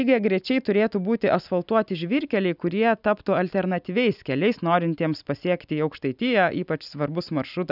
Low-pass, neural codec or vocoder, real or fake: 5.4 kHz; none; real